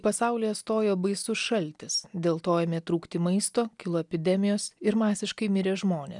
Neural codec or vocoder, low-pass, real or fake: none; 10.8 kHz; real